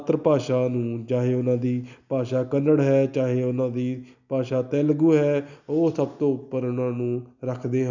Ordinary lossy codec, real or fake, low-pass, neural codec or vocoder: none; real; 7.2 kHz; none